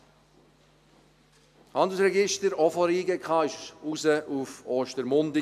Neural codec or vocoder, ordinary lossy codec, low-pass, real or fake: none; AAC, 96 kbps; 14.4 kHz; real